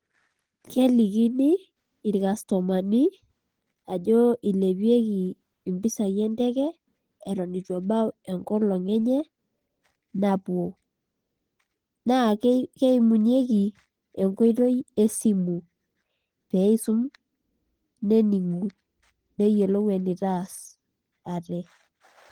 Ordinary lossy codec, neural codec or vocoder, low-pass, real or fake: Opus, 16 kbps; none; 19.8 kHz; real